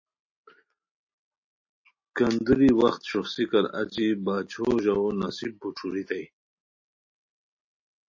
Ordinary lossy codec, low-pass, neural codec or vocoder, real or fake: MP3, 32 kbps; 7.2 kHz; none; real